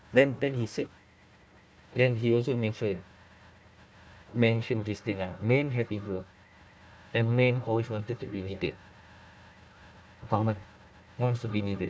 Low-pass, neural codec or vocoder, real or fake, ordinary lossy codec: none; codec, 16 kHz, 1 kbps, FunCodec, trained on Chinese and English, 50 frames a second; fake; none